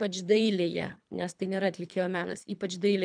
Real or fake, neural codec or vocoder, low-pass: fake; codec, 24 kHz, 3 kbps, HILCodec; 9.9 kHz